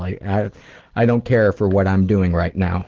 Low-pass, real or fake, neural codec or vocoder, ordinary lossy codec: 7.2 kHz; real; none; Opus, 16 kbps